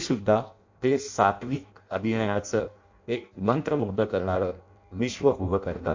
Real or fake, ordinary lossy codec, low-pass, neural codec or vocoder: fake; MP3, 48 kbps; 7.2 kHz; codec, 16 kHz in and 24 kHz out, 0.6 kbps, FireRedTTS-2 codec